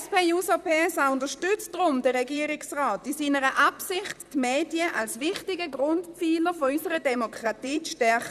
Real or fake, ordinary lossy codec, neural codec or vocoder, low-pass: fake; none; vocoder, 44.1 kHz, 128 mel bands, Pupu-Vocoder; 14.4 kHz